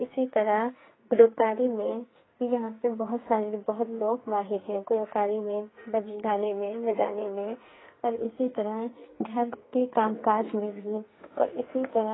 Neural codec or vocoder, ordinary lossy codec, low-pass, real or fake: codec, 44.1 kHz, 2.6 kbps, SNAC; AAC, 16 kbps; 7.2 kHz; fake